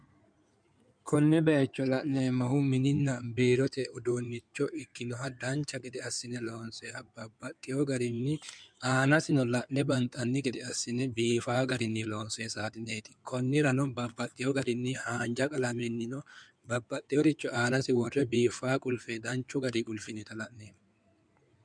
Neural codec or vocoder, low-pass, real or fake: codec, 16 kHz in and 24 kHz out, 2.2 kbps, FireRedTTS-2 codec; 9.9 kHz; fake